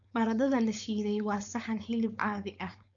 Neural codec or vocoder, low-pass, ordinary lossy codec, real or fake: codec, 16 kHz, 4.8 kbps, FACodec; 7.2 kHz; none; fake